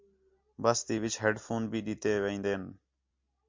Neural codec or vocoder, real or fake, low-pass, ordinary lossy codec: none; real; 7.2 kHz; MP3, 64 kbps